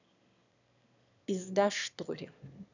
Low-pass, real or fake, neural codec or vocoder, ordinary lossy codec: 7.2 kHz; fake; autoencoder, 22.05 kHz, a latent of 192 numbers a frame, VITS, trained on one speaker; none